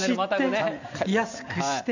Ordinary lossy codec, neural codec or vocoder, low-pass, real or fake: none; none; 7.2 kHz; real